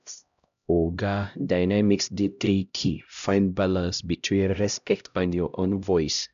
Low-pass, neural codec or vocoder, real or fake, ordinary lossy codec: 7.2 kHz; codec, 16 kHz, 0.5 kbps, X-Codec, HuBERT features, trained on LibriSpeech; fake; none